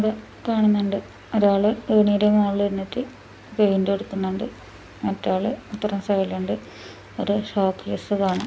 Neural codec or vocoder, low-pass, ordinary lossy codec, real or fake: none; none; none; real